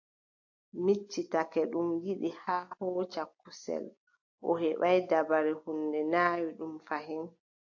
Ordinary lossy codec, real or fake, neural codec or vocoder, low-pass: AAC, 48 kbps; real; none; 7.2 kHz